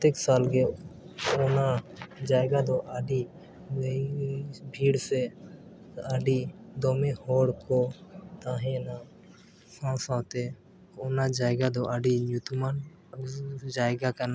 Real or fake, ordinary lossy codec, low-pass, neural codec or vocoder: real; none; none; none